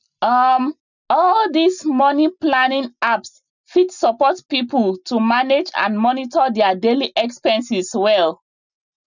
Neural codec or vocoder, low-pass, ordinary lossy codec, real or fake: none; 7.2 kHz; none; real